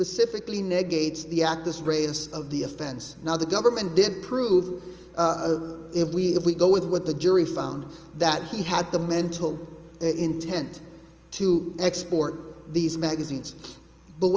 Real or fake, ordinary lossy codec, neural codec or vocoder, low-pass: real; Opus, 24 kbps; none; 7.2 kHz